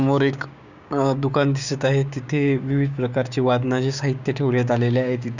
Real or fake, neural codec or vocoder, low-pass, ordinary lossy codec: fake; codec, 16 kHz, 6 kbps, DAC; 7.2 kHz; none